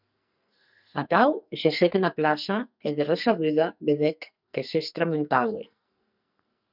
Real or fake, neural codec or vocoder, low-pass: fake; codec, 44.1 kHz, 2.6 kbps, SNAC; 5.4 kHz